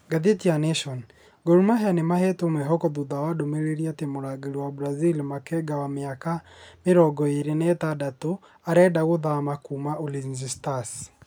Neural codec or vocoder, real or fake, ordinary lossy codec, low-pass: none; real; none; none